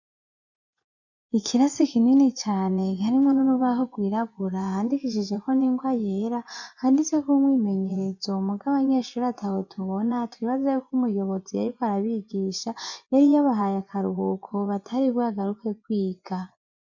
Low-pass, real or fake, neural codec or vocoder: 7.2 kHz; fake; vocoder, 24 kHz, 100 mel bands, Vocos